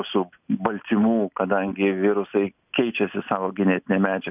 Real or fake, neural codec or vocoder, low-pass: real; none; 3.6 kHz